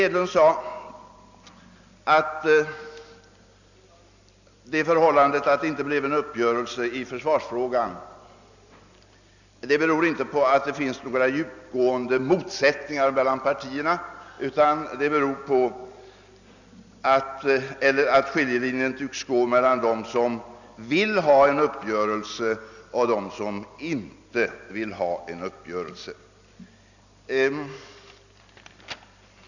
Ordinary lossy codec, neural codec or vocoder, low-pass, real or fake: none; none; 7.2 kHz; real